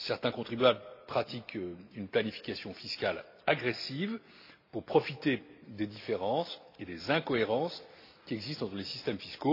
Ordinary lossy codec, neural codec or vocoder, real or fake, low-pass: AAC, 32 kbps; none; real; 5.4 kHz